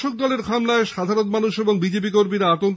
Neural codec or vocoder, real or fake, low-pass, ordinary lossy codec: none; real; none; none